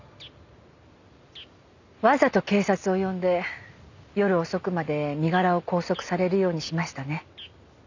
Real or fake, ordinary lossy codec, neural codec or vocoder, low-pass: real; none; none; 7.2 kHz